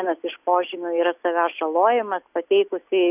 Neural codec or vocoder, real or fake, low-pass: none; real; 3.6 kHz